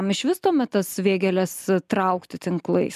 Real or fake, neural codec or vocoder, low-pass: real; none; 14.4 kHz